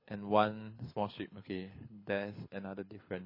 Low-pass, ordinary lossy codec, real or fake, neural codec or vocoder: 5.4 kHz; MP3, 24 kbps; fake; codec, 24 kHz, 6 kbps, HILCodec